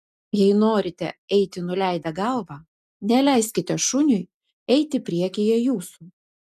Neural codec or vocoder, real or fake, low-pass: none; real; 14.4 kHz